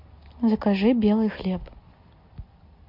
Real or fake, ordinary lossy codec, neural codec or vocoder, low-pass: real; MP3, 48 kbps; none; 5.4 kHz